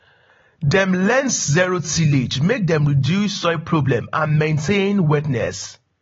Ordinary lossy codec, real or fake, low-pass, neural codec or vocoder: AAC, 24 kbps; real; 7.2 kHz; none